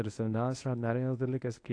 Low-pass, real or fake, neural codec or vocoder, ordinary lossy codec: 9.9 kHz; fake; codec, 24 kHz, 0.9 kbps, WavTokenizer, medium speech release version 1; AAC, 48 kbps